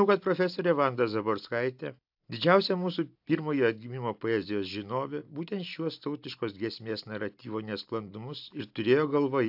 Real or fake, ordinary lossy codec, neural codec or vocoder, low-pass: real; AAC, 48 kbps; none; 5.4 kHz